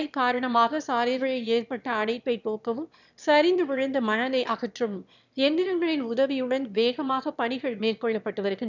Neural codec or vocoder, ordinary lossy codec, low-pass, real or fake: autoencoder, 22.05 kHz, a latent of 192 numbers a frame, VITS, trained on one speaker; none; 7.2 kHz; fake